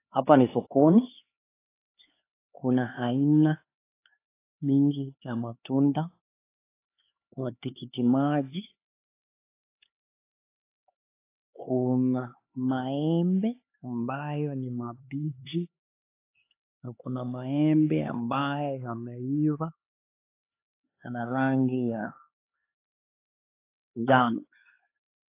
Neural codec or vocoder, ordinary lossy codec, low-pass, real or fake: codec, 16 kHz, 4 kbps, X-Codec, HuBERT features, trained on LibriSpeech; AAC, 24 kbps; 3.6 kHz; fake